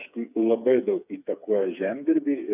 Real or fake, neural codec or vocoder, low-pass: fake; codec, 16 kHz, 4 kbps, FreqCodec, smaller model; 3.6 kHz